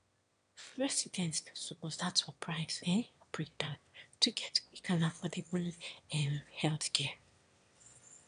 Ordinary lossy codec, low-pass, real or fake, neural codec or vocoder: AAC, 96 kbps; 9.9 kHz; fake; autoencoder, 22.05 kHz, a latent of 192 numbers a frame, VITS, trained on one speaker